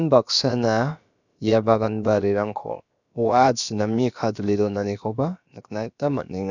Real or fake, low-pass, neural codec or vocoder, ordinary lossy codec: fake; 7.2 kHz; codec, 16 kHz, about 1 kbps, DyCAST, with the encoder's durations; none